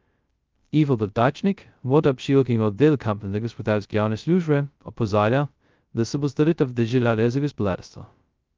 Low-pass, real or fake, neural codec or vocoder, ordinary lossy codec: 7.2 kHz; fake; codec, 16 kHz, 0.2 kbps, FocalCodec; Opus, 24 kbps